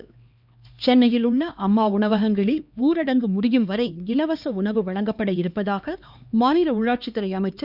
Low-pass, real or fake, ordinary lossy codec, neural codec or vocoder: 5.4 kHz; fake; Opus, 64 kbps; codec, 16 kHz, 2 kbps, X-Codec, HuBERT features, trained on LibriSpeech